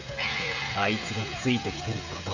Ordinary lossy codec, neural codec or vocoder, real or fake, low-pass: none; codec, 16 kHz, 16 kbps, FreqCodec, smaller model; fake; 7.2 kHz